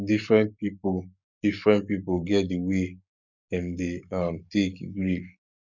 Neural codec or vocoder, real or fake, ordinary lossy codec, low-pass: codec, 44.1 kHz, 7.8 kbps, Pupu-Codec; fake; none; 7.2 kHz